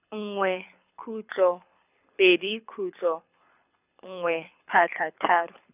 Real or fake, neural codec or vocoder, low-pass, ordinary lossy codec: fake; codec, 24 kHz, 6 kbps, HILCodec; 3.6 kHz; none